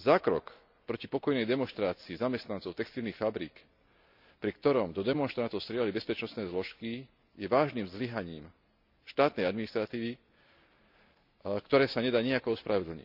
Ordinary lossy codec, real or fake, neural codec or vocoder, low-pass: none; real; none; 5.4 kHz